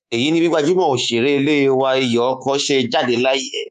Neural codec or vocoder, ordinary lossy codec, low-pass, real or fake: codec, 24 kHz, 3.1 kbps, DualCodec; none; 10.8 kHz; fake